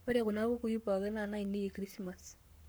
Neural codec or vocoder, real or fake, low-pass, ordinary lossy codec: codec, 44.1 kHz, 7.8 kbps, Pupu-Codec; fake; none; none